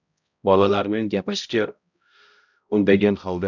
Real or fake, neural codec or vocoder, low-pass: fake; codec, 16 kHz, 0.5 kbps, X-Codec, HuBERT features, trained on balanced general audio; 7.2 kHz